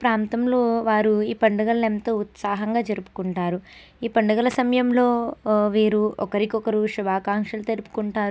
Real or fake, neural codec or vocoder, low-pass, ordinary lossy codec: real; none; none; none